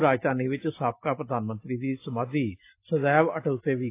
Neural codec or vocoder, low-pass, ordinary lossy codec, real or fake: none; 3.6 kHz; AAC, 24 kbps; real